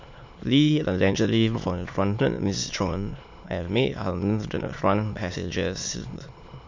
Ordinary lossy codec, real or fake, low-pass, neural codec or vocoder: MP3, 48 kbps; fake; 7.2 kHz; autoencoder, 22.05 kHz, a latent of 192 numbers a frame, VITS, trained on many speakers